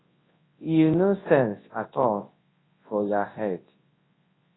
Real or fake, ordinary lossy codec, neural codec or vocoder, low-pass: fake; AAC, 16 kbps; codec, 24 kHz, 0.9 kbps, WavTokenizer, large speech release; 7.2 kHz